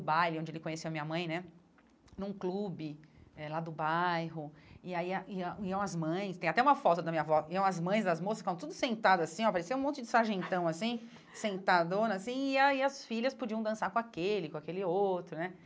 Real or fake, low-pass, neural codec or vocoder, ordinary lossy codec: real; none; none; none